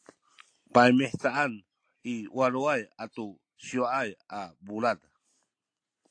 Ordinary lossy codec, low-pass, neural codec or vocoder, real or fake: AAC, 48 kbps; 9.9 kHz; none; real